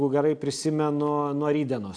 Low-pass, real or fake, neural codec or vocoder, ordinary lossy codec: 9.9 kHz; real; none; AAC, 64 kbps